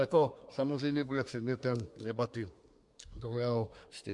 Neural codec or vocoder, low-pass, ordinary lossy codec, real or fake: codec, 24 kHz, 1 kbps, SNAC; 10.8 kHz; MP3, 64 kbps; fake